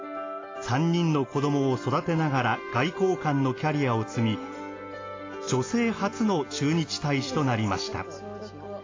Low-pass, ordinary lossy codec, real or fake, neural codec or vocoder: 7.2 kHz; AAC, 32 kbps; real; none